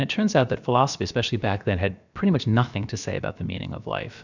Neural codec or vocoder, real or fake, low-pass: codec, 16 kHz, about 1 kbps, DyCAST, with the encoder's durations; fake; 7.2 kHz